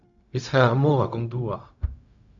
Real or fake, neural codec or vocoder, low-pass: fake; codec, 16 kHz, 0.4 kbps, LongCat-Audio-Codec; 7.2 kHz